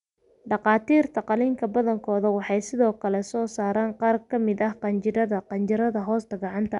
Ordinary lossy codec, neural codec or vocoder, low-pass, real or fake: none; none; 10.8 kHz; real